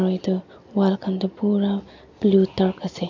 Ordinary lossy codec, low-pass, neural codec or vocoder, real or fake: AAC, 48 kbps; 7.2 kHz; none; real